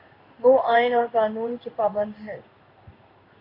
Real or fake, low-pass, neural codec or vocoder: fake; 5.4 kHz; codec, 16 kHz in and 24 kHz out, 1 kbps, XY-Tokenizer